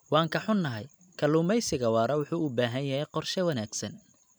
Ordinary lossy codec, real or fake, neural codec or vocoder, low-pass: none; real; none; none